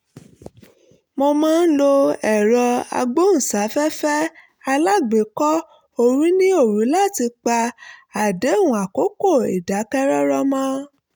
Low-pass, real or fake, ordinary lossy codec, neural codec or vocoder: none; real; none; none